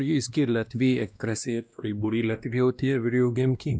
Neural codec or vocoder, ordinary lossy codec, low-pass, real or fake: codec, 16 kHz, 1 kbps, X-Codec, WavLM features, trained on Multilingual LibriSpeech; none; none; fake